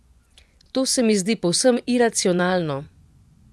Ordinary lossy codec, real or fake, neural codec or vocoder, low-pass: none; fake; vocoder, 24 kHz, 100 mel bands, Vocos; none